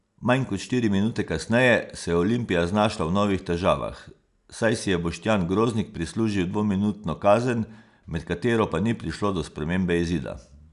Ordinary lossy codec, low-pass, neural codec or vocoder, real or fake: none; 10.8 kHz; none; real